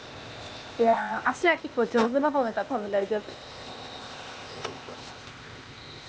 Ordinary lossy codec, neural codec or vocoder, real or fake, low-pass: none; codec, 16 kHz, 0.8 kbps, ZipCodec; fake; none